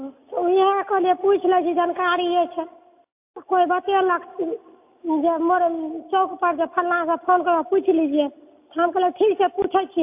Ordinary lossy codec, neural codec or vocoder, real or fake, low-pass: AAC, 32 kbps; none; real; 3.6 kHz